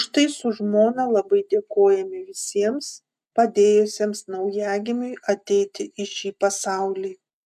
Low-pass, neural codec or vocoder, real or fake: 14.4 kHz; none; real